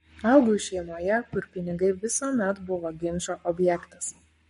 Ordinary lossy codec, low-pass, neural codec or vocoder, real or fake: MP3, 48 kbps; 19.8 kHz; codec, 44.1 kHz, 7.8 kbps, Pupu-Codec; fake